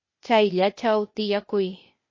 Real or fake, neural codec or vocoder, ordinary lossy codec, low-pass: fake; codec, 16 kHz, 0.8 kbps, ZipCodec; MP3, 32 kbps; 7.2 kHz